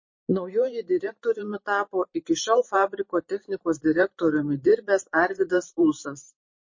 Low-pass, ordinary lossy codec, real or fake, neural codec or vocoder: 7.2 kHz; MP3, 32 kbps; fake; vocoder, 44.1 kHz, 128 mel bands every 512 samples, BigVGAN v2